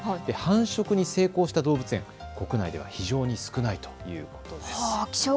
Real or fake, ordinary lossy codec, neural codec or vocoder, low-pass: real; none; none; none